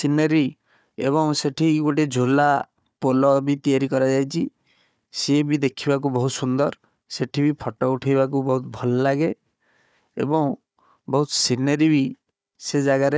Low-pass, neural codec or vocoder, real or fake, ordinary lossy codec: none; codec, 16 kHz, 4 kbps, FunCodec, trained on Chinese and English, 50 frames a second; fake; none